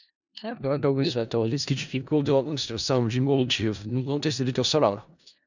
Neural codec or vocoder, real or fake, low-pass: codec, 16 kHz in and 24 kHz out, 0.4 kbps, LongCat-Audio-Codec, four codebook decoder; fake; 7.2 kHz